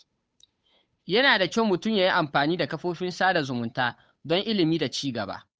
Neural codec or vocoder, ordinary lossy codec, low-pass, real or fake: codec, 16 kHz, 8 kbps, FunCodec, trained on Chinese and English, 25 frames a second; none; none; fake